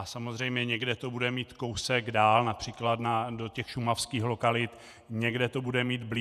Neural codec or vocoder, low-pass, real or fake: vocoder, 48 kHz, 128 mel bands, Vocos; 14.4 kHz; fake